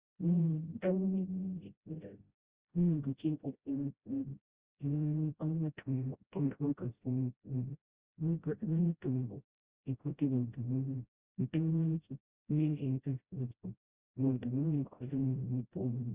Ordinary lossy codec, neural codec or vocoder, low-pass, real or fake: Opus, 32 kbps; codec, 16 kHz, 0.5 kbps, FreqCodec, smaller model; 3.6 kHz; fake